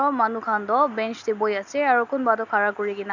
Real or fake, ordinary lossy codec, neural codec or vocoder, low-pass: real; none; none; 7.2 kHz